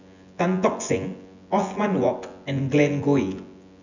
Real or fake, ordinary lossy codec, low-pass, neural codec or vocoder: fake; none; 7.2 kHz; vocoder, 24 kHz, 100 mel bands, Vocos